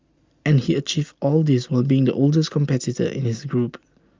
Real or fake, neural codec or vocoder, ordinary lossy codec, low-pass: real; none; Opus, 32 kbps; 7.2 kHz